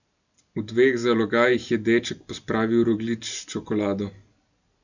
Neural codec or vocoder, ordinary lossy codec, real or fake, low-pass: none; none; real; 7.2 kHz